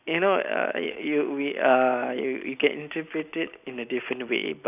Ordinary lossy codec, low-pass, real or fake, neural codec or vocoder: none; 3.6 kHz; real; none